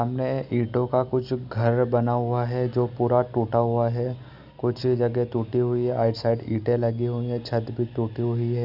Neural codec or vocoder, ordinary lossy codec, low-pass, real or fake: none; none; 5.4 kHz; real